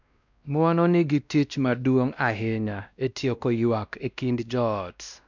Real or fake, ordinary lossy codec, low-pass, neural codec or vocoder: fake; none; 7.2 kHz; codec, 16 kHz, 1 kbps, X-Codec, WavLM features, trained on Multilingual LibriSpeech